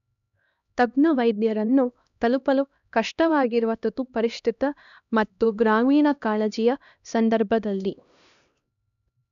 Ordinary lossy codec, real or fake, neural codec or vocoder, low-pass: none; fake; codec, 16 kHz, 1 kbps, X-Codec, HuBERT features, trained on LibriSpeech; 7.2 kHz